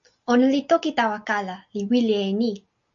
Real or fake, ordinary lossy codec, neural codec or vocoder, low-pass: real; MP3, 64 kbps; none; 7.2 kHz